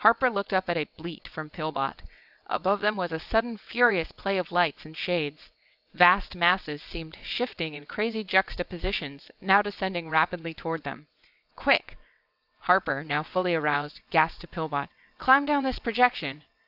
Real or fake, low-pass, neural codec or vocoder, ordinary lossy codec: fake; 5.4 kHz; vocoder, 22.05 kHz, 80 mel bands, WaveNeXt; AAC, 48 kbps